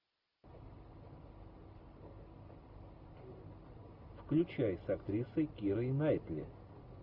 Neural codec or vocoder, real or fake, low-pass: none; real; 5.4 kHz